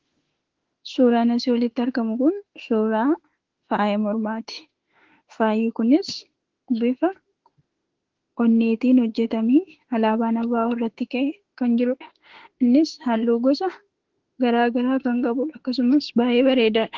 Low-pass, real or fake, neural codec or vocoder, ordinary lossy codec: 7.2 kHz; fake; autoencoder, 48 kHz, 32 numbers a frame, DAC-VAE, trained on Japanese speech; Opus, 16 kbps